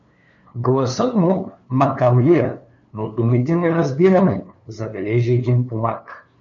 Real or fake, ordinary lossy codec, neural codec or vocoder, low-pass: fake; AAC, 64 kbps; codec, 16 kHz, 2 kbps, FunCodec, trained on LibriTTS, 25 frames a second; 7.2 kHz